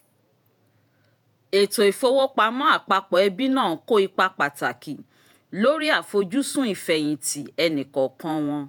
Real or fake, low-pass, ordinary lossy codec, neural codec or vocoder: fake; none; none; vocoder, 48 kHz, 128 mel bands, Vocos